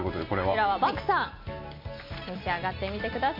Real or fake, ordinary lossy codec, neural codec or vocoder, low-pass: real; MP3, 32 kbps; none; 5.4 kHz